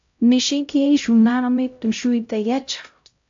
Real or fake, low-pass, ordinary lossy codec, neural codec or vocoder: fake; 7.2 kHz; AAC, 64 kbps; codec, 16 kHz, 0.5 kbps, X-Codec, HuBERT features, trained on LibriSpeech